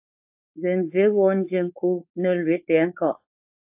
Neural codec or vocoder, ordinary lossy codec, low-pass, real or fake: codec, 16 kHz, 4.8 kbps, FACodec; AAC, 32 kbps; 3.6 kHz; fake